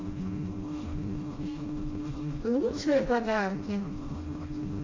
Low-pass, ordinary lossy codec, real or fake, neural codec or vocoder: 7.2 kHz; AAC, 32 kbps; fake; codec, 16 kHz, 1 kbps, FreqCodec, smaller model